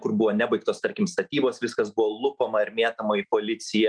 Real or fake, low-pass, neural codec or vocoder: real; 9.9 kHz; none